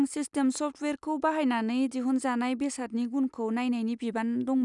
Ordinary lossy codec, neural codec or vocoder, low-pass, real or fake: none; none; 10.8 kHz; real